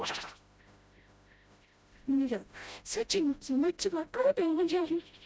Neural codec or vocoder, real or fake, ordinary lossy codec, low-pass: codec, 16 kHz, 0.5 kbps, FreqCodec, smaller model; fake; none; none